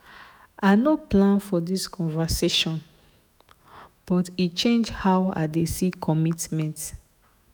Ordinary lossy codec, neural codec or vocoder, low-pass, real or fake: none; autoencoder, 48 kHz, 128 numbers a frame, DAC-VAE, trained on Japanese speech; none; fake